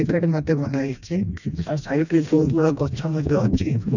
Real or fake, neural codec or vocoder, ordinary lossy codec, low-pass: fake; codec, 16 kHz, 1 kbps, FreqCodec, smaller model; none; 7.2 kHz